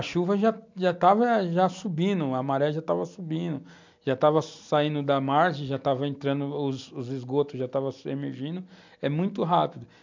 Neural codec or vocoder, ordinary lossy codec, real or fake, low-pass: none; MP3, 64 kbps; real; 7.2 kHz